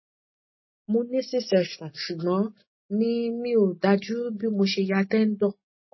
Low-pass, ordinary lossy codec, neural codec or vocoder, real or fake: 7.2 kHz; MP3, 24 kbps; none; real